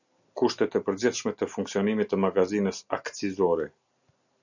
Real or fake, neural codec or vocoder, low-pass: real; none; 7.2 kHz